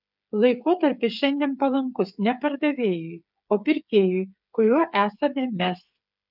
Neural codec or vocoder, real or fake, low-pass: codec, 16 kHz, 8 kbps, FreqCodec, smaller model; fake; 5.4 kHz